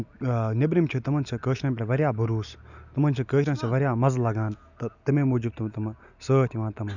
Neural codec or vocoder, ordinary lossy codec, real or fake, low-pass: none; none; real; 7.2 kHz